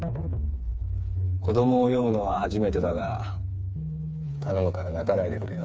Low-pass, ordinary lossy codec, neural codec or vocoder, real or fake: none; none; codec, 16 kHz, 4 kbps, FreqCodec, smaller model; fake